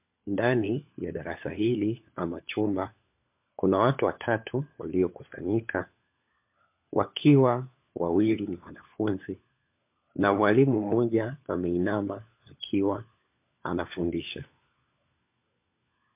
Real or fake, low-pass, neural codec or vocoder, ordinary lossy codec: fake; 3.6 kHz; codec, 16 kHz, 4 kbps, FunCodec, trained on LibriTTS, 50 frames a second; MP3, 32 kbps